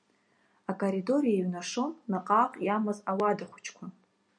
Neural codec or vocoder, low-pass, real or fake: none; 9.9 kHz; real